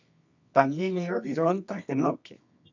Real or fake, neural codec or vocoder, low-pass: fake; codec, 24 kHz, 0.9 kbps, WavTokenizer, medium music audio release; 7.2 kHz